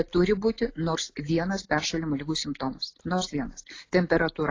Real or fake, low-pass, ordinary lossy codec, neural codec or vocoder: real; 7.2 kHz; AAC, 32 kbps; none